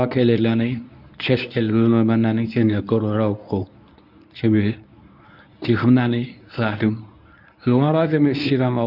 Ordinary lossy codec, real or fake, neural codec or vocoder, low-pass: none; fake; codec, 24 kHz, 0.9 kbps, WavTokenizer, medium speech release version 1; 5.4 kHz